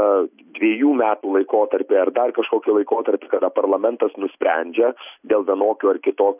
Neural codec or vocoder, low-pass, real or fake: none; 3.6 kHz; real